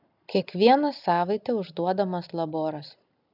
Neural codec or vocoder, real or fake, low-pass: none; real; 5.4 kHz